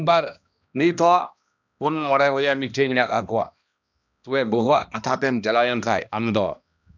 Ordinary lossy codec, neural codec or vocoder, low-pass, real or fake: none; codec, 16 kHz, 1 kbps, X-Codec, HuBERT features, trained on balanced general audio; 7.2 kHz; fake